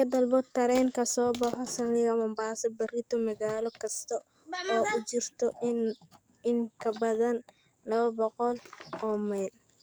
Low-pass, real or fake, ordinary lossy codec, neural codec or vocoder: none; fake; none; codec, 44.1 kHz, 7.8 kbps, DAC